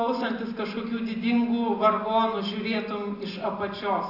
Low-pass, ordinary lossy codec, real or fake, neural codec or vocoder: 5.4 kHz; AAC, 48 kbps; real; none